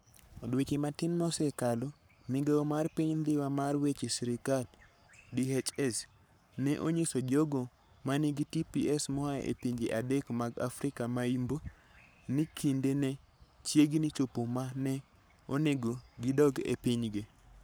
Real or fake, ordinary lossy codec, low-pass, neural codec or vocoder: fake; none; none; codec, 44.1 kHz, 7.8 kbps, Pupu-Codec